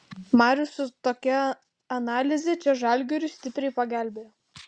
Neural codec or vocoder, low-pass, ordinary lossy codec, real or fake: none; 9.9 kHz; Opus, 64 kbps; real